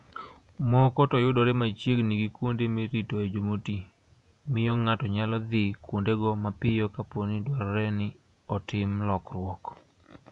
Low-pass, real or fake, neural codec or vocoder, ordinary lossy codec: 10.8 kHz; fake; vocoder, 48 kHz, 128 mel bands, Vocos; none